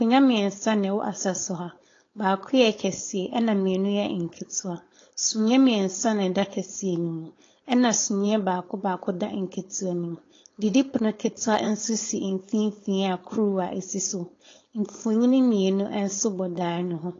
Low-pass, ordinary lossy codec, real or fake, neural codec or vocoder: 7.2 kHz; AAC, 32 kbps; fake; codec, 16 kHz, 4.8 kbps, FACodec